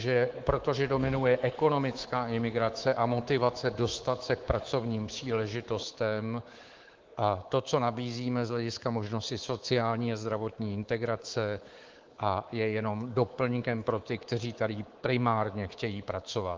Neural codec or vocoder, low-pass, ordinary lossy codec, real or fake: codec, 24 kHz, 3.1 kbps, DualCodec; 7.2 kHz; Opus, 16 kbps; fake